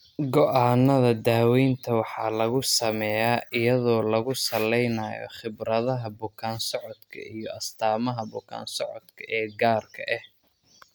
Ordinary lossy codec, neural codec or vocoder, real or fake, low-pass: none; none; real; none